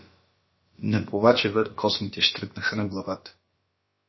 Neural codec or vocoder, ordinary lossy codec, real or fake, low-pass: codec, 16 kHz, about 1 kbps, DyCAST, with the encoder's durations; MP3, 24 kbps; fake; 7.2 kHz